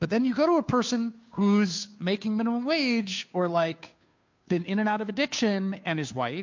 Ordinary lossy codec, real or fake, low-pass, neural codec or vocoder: MP3, 48 kbps; fake; 7.2 kHz; codec, 16 kHz, 2 kbps, FunCodec, trained on Chinese and English, 25 frames a second